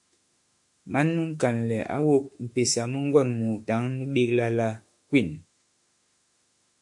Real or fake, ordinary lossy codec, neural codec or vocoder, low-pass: fake; MP3, 64 kbps; autoencoder, 48 kHz, 32 numbers a frame, DAC-VAE, trained on Japanese speech; 10.8 kHz